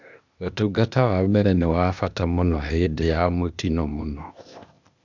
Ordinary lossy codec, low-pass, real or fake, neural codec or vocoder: none; 7.2 kHz; fake; codec, 16 kHz, 0.8 kbps, ZipCodec